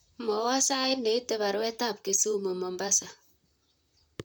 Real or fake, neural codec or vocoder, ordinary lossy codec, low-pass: fake; vocoder, 44.1 kHz, 128 mel bands, Pupu-Vocoder; none; none